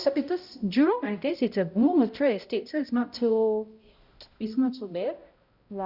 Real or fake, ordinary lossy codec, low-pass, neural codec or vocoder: fake; Opus, 64 kbps; 5.4 kHz; codec, 16 kHz, 0.5 kbps, X-Codec, HuBERT features, trained on balanced general audio